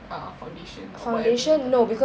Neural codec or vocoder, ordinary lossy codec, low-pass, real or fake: none; none; none; real